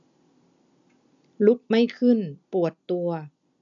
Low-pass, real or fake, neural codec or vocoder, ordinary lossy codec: 7.2 kHz; real; none; none